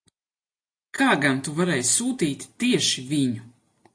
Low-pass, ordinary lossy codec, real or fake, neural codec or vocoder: 9.9 kHz; AAC, 48 kbps; real; none